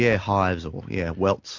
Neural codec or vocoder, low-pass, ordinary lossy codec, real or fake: none; 7.2 kHz; MP3, 48 kbps; real